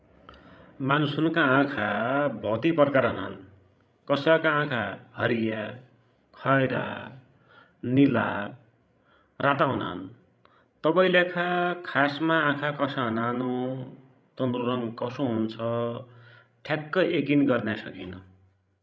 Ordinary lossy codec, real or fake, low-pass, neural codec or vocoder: none; fake; none; codec, 16 kHz, 16 kbps, FreqCodec, larger model